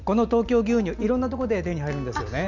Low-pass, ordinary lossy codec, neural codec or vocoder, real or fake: 7.2 kHz; none; none; real